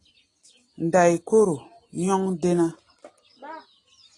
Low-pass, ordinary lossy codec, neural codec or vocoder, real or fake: 10.8 kHz; AAC, 32 kbps; none; real